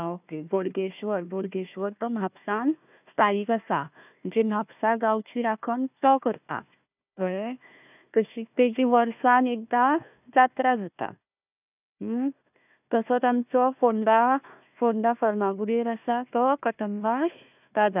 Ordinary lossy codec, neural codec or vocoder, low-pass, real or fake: none; codec, 16 kHz, 1 kbps, FunCodec, trained on Chinese and English, 50 frames a second; 3.6 kHz; fake